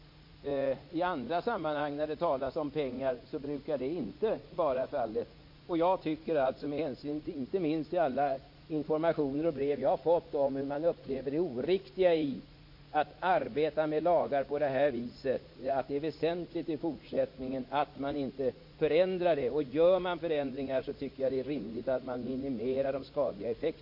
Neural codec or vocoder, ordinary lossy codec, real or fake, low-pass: vocoder, 44.1 kHz, 80 mel bands, Vocos; MP3, 32 kbps; fake; 5.4 kHz